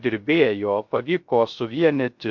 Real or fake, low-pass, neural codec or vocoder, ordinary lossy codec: fake; 7.2 kHz; codec, 16 kHz, 0.3 kbps, FocalCodec; MP3, 48 kbps